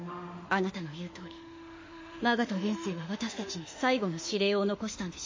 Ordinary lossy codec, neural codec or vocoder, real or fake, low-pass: MP3, 32 kbps; autoencoder, 48 kHz, 32 numbers a frame, DAC-VAE, trained on Japanese speech; fake; 7.2 kHz